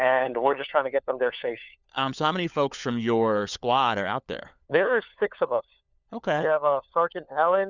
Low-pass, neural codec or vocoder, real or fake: 7.2 kHz; codec, 16 kHz, 4 kbps, FunCodec, trained on LibriTTS, 50 frames a second; fake